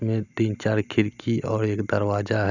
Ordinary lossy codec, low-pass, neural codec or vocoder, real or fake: none; 7.2 kHz; none; real